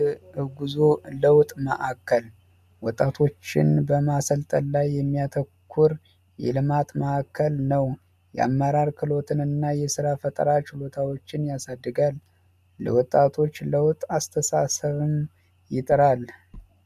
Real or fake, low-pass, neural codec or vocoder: real; 14.4 kHz; none